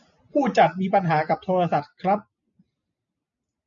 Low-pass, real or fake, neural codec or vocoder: 7.2 kHz; real; none